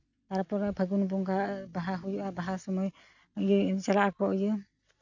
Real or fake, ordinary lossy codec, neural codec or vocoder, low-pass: real; none; none; 7.2 kHz